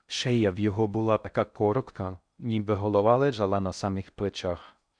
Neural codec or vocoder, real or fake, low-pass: codec, 16 kHz in and 24 kHz out, 0.6 kbps, FocalCodec, streaming, 4096 codes; fake; 9.9 kHz